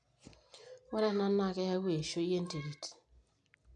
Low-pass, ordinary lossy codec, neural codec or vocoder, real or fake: 9.9 kHz; none; none; real